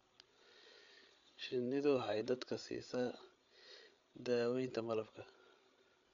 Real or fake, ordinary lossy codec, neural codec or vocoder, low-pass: fake; none; codec, 16 kHz, 8 kbps, FreqCodec, larger model; 7.2 kHz